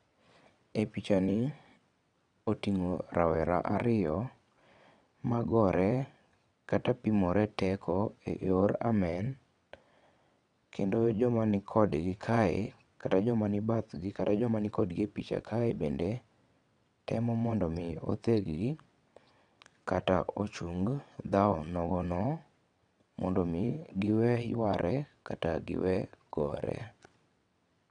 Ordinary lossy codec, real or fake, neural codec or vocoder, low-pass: none; fake; vocoder, 22.05 kHz, 80 mel bands, WaveNeXt; 9.9 kHz